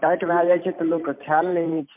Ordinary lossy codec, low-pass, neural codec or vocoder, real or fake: MP3, 32 kbps; 3.6 kHz; vocoder, 44.1 kHz, 128 mel bands every 512 samples, BigVGAN v2; fake